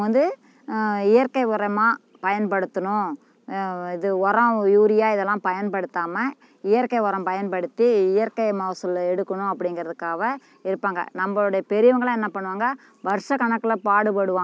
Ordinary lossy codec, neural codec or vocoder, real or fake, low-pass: none; none; real; none